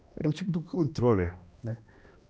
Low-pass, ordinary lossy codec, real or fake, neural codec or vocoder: none; none; fake; codec, 16 kHz, 1 kbps, X-Codec, HuBERT features, trained on balanced general audio